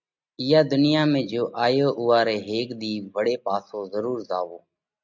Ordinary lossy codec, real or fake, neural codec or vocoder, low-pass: MP3, 64 kbps; real; none; 7.2 kHz